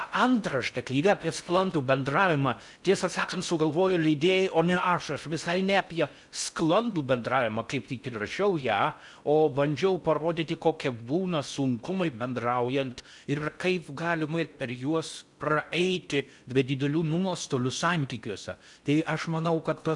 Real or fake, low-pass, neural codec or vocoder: fake; 10.8 kHz; codec, 16 kHz in and 24 kHz out, 0.6 kbps, FocalCodec, streaming, 4096 codes